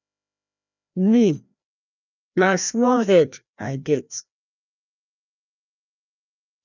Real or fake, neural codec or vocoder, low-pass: fake; codec, 16 kHz, 1 kbps, FreqCodec, larger model; 7.2 kHz